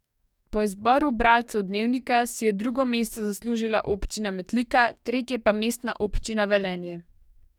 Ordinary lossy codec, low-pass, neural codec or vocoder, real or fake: none; 19.8 kHz; codec, 44.1 kHz, 2.6 kbps, DAC; fake